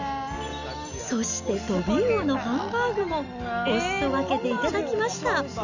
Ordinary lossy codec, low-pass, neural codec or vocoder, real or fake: none; 7.2 kHz; none; real